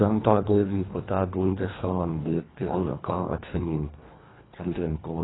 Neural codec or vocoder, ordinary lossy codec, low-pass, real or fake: codec, 24 kHz, 1.5 kbps, HILCodec; AAC, 16 kbps; 7.2 kHz; fake